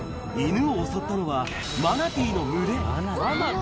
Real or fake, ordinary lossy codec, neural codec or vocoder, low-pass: real; none; none; none